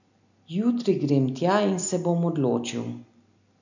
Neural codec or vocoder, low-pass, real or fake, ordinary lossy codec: none; 7.2 kHz; real; none